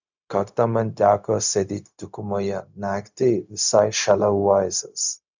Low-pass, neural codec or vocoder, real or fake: 7.2 kHz; codec, 16 kHz, 0.4 kbps, LongCat-Audio-Codec; fake